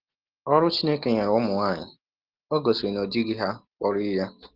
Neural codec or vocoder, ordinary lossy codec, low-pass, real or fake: none; Opus, 16 kbps; 5.4 kHz; real